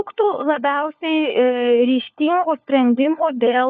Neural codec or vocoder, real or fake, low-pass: codec, 16 kHz, 4 kbps, FunCodec, trained on LibriTTS, 50 frames a second; fake; 7.2 kHz